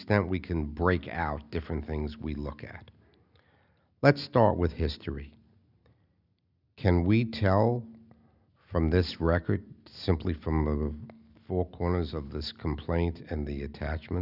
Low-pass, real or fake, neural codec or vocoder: 5.4 kHz; real; none